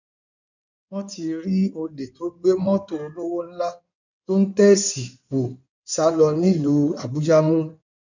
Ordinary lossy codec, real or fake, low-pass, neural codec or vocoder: none; fake; 7.2 kHz; codec, 16 kHz in and 24 kHz out, 2.2 kbps, FireRedTTS-2 codec